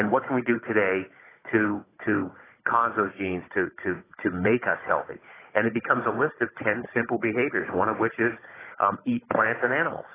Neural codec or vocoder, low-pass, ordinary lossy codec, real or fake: codec, 16 kHz, 16 kbps, FunCodec, trained on Chinese and English, 50 frames a second; 3.6 kHz; AAC, 16 kbps; fake